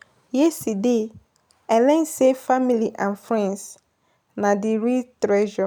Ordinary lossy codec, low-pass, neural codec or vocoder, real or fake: none; none; none; real